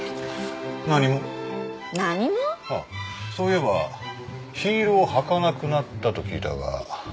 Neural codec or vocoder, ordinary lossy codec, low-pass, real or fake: none; none; none; real